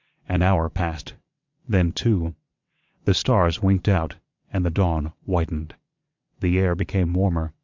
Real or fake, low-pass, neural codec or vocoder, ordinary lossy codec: fake; 7.2 kHz; vocoder, 22.05 kHz, 80 mel bands, WaveNeXt; MP3, 64 kbps